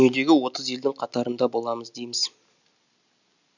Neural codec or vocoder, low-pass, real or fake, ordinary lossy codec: none; 7.2 kHz; real; none